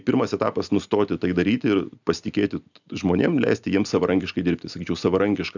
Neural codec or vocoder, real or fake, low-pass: none; real; 7.2 kHz